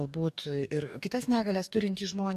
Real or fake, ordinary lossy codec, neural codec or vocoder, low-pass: fake; AAC, 96 kbps; codec, 44.1 kHz, 2.6 kbps, DAC; 14.4 kHz